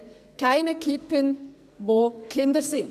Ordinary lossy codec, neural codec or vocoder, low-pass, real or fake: none; codec, 44.1 kHz, 2.6 kbps, SNAC; 14.4 kHz; fake